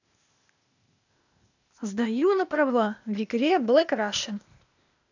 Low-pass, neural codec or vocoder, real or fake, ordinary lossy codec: 7.2 kHz; codec, 16 kHz, 0.8 kbps, ZipCodec; fake; none